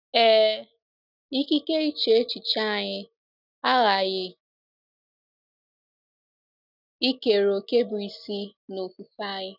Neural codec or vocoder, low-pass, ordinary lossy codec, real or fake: none; 5.4 kHz; none; real